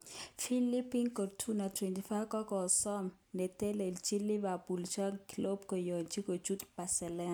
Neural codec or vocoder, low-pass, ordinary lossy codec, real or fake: none; none; none; real